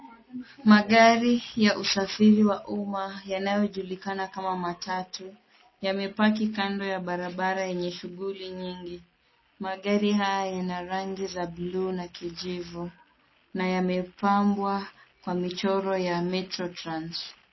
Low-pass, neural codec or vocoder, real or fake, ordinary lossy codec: 7.2 kHz; none; real; MP3, 24 kbps